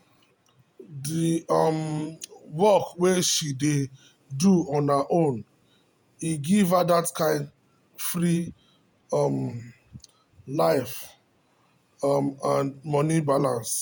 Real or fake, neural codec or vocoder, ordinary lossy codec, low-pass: fake; vocoder, 48 kHz, 128 mel bands, Vocos; none; none